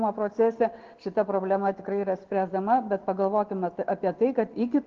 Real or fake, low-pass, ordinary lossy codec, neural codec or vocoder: real; 7.2 kHz; Opus, 16 kbps; none